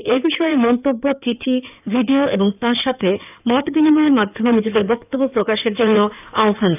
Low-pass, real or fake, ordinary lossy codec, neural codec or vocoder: 3.6 kHz; fake; none; codec, 16 kHz in and 24 kHz out, 2.2 kbps, FireRedTTS-2 codec